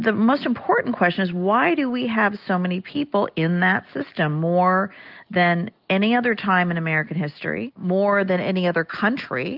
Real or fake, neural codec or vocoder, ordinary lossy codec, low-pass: real; none; Opus, 32 kbps; 5.4 kHz